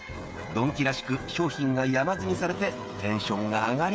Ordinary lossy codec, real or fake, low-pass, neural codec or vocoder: none; fake; none; codec, 16 kHz, 8 kbps, FreqCodec, smaller model